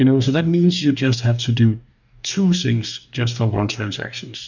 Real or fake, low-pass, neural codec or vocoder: fake; 7.2 kHz; codec, 44.1 kHz, 2.6 kbps, DAC